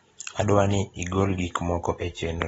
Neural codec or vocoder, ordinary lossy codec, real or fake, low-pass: none; AAC, 24 kbps; real; 19.8 kHz